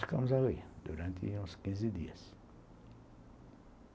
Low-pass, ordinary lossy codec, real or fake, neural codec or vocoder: none; none; real; none